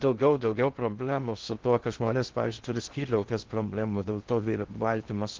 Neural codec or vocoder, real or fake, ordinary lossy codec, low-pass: codec, 16 kHz in and 24 kHz out, 0.6 kbps, FocalCodec, streaming, 4096 codes; fake; Opus, 16 kbps; 7.2 kHz